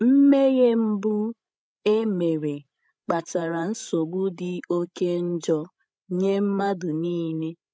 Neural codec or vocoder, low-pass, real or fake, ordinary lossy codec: codec, 16 kHz, 16 kbps, FreqCodec, larger model; none; fake; none